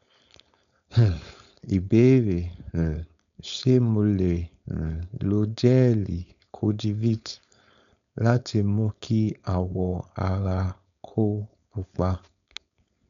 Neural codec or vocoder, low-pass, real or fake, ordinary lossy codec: codec, 16 kHz, 4.8 kbps, FACodec; 7.2 kHz; fake; none